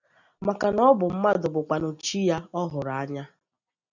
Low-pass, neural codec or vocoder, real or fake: 7.2 kHz; none; real